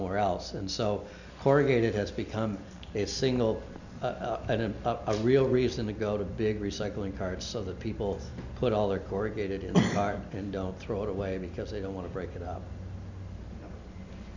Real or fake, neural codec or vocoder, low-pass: real; none; 7.2 kHz